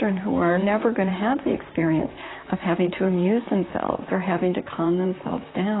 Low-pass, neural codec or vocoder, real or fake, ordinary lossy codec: 7.2 kHz; vocoder, 44.1 kHz, 128 mel bands, Pupu-Vocoder; fake; AAC, 16 kbps